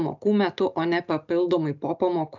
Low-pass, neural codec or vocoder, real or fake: 7.2 kHz; none; real